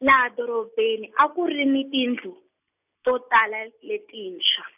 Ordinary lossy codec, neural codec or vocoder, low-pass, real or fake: none; none; 3.6 kHz; real